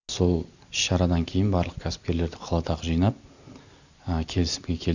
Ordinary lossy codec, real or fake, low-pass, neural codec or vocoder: none; real; 7.2 kHz; none